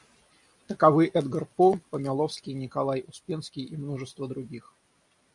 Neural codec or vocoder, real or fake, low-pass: none; real; 10.8 kHz